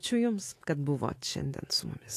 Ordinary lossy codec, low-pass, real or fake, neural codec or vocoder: AAC, 48 kbps; 14.4 kHz; fake; autoencoder, 48 kHz, 128 numbers a frame, DAC-VAE, trained on Japanese speech